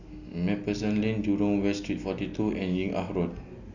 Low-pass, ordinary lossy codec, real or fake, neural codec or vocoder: 7.2 kHz; none; real; none